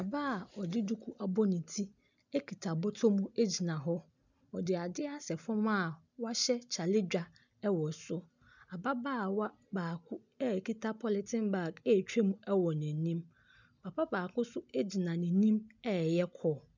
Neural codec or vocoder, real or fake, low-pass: none; real; 7.2 kHz